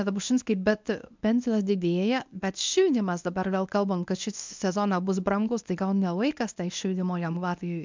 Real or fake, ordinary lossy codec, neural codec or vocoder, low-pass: fake; MP3, 64 kbps; codec, 24 kHz, 0.9 kbps, WavTokenizer, medium speech release version 1; 7.2 kHz